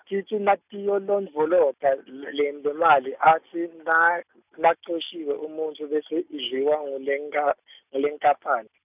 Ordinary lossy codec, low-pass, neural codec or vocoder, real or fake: none; 3.6 kHz; none; real